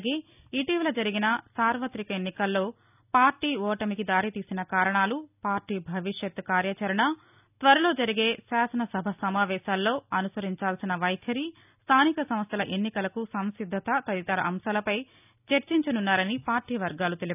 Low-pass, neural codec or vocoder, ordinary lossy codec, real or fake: 3.6 kHz; none; none; real